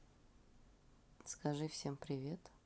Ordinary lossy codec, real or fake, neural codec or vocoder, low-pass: none; real; none; none